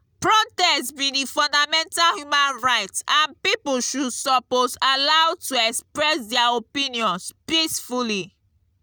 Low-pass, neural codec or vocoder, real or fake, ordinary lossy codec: none; none; real; none